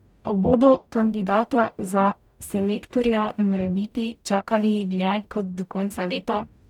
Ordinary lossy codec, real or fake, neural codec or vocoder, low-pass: none; fake; codec, 44.1 kHz, 0.9 kbps, DAC; 19.8 kHz